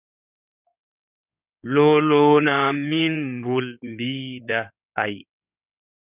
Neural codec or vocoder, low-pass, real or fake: codec, 16 kHz in and 24 kHz out, 2.2 kbps, FireRedTTS-2 codec; 3.6 kHz; fake